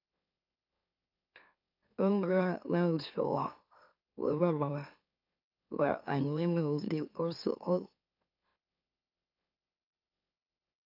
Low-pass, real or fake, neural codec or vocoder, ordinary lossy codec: 5.4 kHz; fake; autoencoder, 44.1 kHz, a latent of 192 numbers a frame, MeloTTS; AAC, 48 kbps